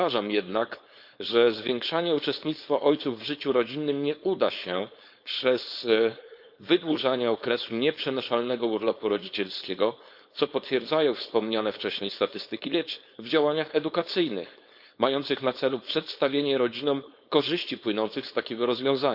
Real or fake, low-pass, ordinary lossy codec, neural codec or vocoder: fake; 5.4 kHz; Opus, 64 kbps; codec, 16 kHz, 4.8 kbps, FACodec